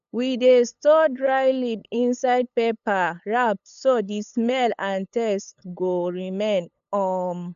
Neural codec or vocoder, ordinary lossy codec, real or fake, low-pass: codec, 16 kHz, 8 kbps, FunCodec, trained on LibriTTS, 25 frames a second; none; fake; 7.2 kHz